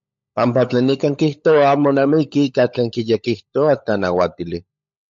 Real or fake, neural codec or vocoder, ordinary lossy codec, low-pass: fake; codec, 16 kHz, 16 kbps, FunCodec, trained on LibriTTS, 50 frames a second; MP3, 48 kbps; 7.2 kHz